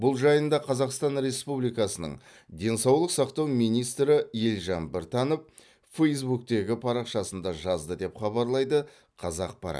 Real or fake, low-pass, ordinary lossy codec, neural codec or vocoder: real; none; none; none